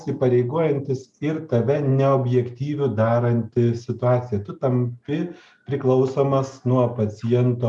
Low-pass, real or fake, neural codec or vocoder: 10.8 kHz; real; none